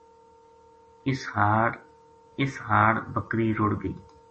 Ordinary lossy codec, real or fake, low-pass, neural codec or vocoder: MP3, 32 kbps; real; 9.9 kHz; none